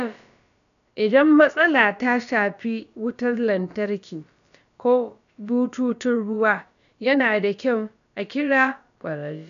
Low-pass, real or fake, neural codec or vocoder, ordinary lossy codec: 7.2 kHz; fake; codec, 16 kHz, about 1 kbps, DyCAST, with the encoder's durations; none